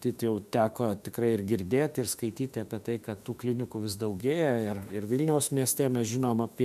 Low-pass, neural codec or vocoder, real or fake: 14.4 kHz; autoencoder, 48 kHz, 32 numbers a frame, DAC-VAE, trained on Japanese speech; fake